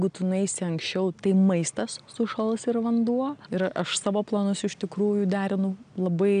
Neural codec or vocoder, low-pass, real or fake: none; 9.9 kHz; real